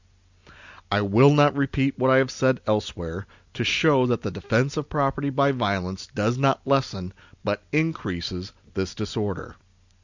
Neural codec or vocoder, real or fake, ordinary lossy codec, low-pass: none; real; Opus, 64 kbps; 7.2 kHz